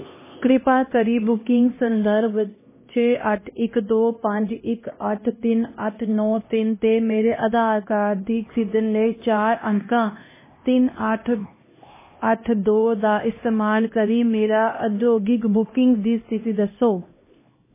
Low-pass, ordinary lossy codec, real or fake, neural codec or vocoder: 3.6 kHz; MP3, 16 kbps; fake; codec, 16 kHz, 1 kbps, X-Codec, HuBERT features, trained on LibriSpeech